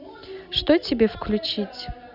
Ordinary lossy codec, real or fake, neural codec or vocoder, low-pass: none; real; none; 5.4 kHz